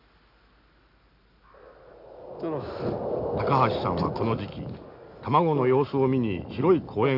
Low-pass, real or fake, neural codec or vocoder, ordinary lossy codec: 5.4 kHz; real; none; none